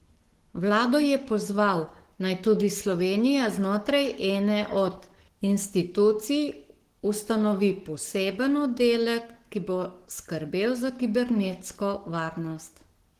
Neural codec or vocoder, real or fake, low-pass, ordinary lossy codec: codec, 44.1 kHz, 7.8 kbps, Pupu-Codec; fake; 14.4 kHz; Opus, 16 kbps